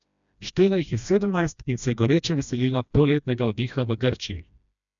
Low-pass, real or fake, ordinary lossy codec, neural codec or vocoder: 7.2 kHz; fake; none; codec, 16 kHz, 1 kbps, FreqCodec, smaller model